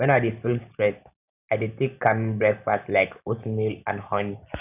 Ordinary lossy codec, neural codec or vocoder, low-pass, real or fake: none; none; 3.6 kHz; real